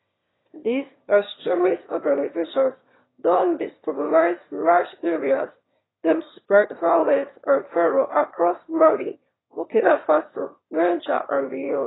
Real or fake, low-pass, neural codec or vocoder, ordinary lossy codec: fake; 7.2 kHz; autoencoder, 22.05 kHz, a latent of 192 numbers a frame, VITS, trained on one speaker; AAC, 16 kbps